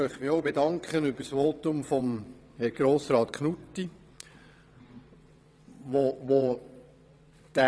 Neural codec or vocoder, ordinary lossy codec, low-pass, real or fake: vocoder, 22.05 kHz, 80 mel bands, WaveNeXt; none; none; fake